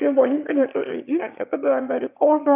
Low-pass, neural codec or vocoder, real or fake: 3.6 kHz; autoencoder, 22.05 kHz, a latent of 192 numbers a frame, VITS, trained on one speaker; fake